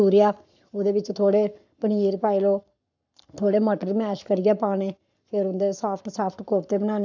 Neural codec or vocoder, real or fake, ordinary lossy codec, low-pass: codec, 44.1 kHz, 7.8 kbps, Pupu-Codec; fake; none; 7.2 kHz